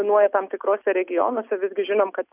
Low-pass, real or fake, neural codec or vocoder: 3.6 kHz; real; none